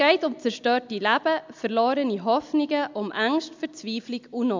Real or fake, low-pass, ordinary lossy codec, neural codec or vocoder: real; 7.2 kHz; MP3, 64 kbps; none